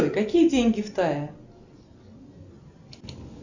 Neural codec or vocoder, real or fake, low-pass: none; real; 7.2 kHz